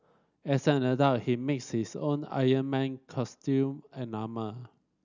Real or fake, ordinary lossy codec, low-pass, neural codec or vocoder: real; none; 7.2 kHz; none